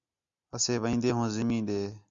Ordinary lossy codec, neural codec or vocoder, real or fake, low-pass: Opus, 64 kbps; none; real; 7.2 kHz